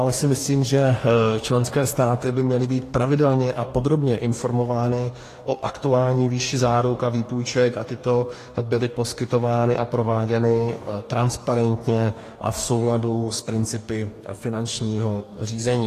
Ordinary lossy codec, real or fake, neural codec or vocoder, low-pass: AAC, 48 kbps; fake; codec, 44.1 kHz, 2.6 kbps, DAC; 14.4 kHz